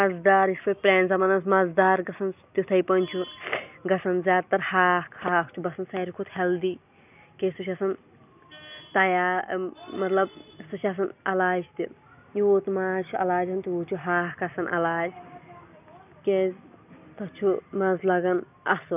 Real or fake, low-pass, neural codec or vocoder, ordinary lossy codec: real; 3.6 kHz; none; none